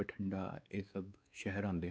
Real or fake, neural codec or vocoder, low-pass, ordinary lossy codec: fake; codec, 16 kHz, 4 kbps, X-Codec, WavLM features, trained on Multilingual LibriSpeech; none; none